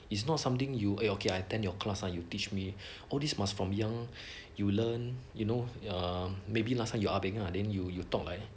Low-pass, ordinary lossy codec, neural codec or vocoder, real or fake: none; none; none; real